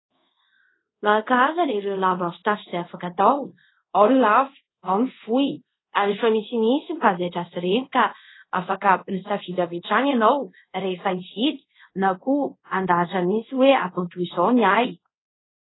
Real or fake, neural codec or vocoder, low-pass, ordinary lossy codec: fake; codec, 24 kHz, 0.5 kbps, DualCodec; 7.2 kHz; AAC, 16 kbps